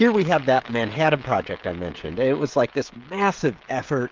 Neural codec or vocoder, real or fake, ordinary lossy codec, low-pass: codec, 16 kHz, 16 kbps, FreqCodec, smaller model; fake; Opus, 24 kbps; 7.2 kHz